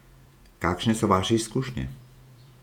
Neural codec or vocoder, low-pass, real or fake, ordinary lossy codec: vocoder, 48 kHz, 128 mel bands, Vocos; 19.8 kHz; fake; none